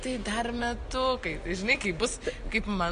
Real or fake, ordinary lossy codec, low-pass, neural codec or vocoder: real; MP3, 48 kbps; 9.9 kHz; none